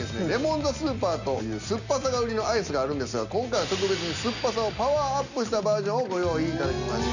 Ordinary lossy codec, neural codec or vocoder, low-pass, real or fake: none; none; 7.2 kHz; real